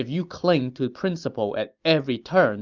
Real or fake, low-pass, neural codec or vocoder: real; 7.2 kHz; none